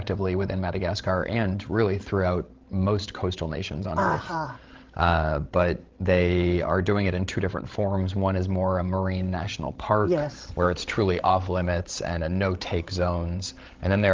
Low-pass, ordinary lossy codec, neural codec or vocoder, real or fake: 7.2 kHz; Opus, 16 kbps; codec, 16 kHz, 16 kbps, FreqCodec, larger model; fake